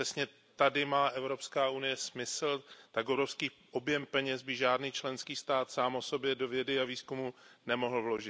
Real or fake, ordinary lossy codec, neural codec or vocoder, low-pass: real; none; none; none